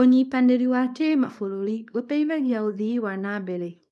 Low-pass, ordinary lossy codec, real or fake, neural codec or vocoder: none; none; fake; codec, 24 kHz, 0.9 kbps, WavTokenizer, small release